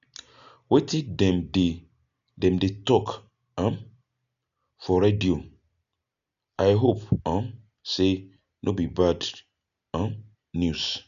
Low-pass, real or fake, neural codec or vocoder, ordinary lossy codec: 7.2 kHz; real; none; none